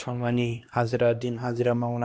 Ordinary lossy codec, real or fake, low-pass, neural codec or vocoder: none; fake; none; codec, 16 kHz, 1 kbps, X-Codec, HuBERT features, trained on LibriSpeech